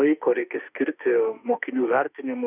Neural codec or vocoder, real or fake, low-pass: autoencoder, 48 kHz, 32 numbers a frame, DAC-VAE, trained on Japanese speech; fake; 3.6 kHz